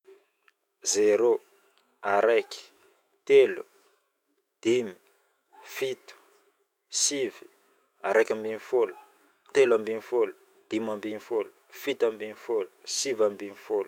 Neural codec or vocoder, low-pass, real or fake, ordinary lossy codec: autoencoder, 48 kHz, 128 numbers a frame, DAC-VAE, trained on Japanese speech; 19.8 kHz; fake; none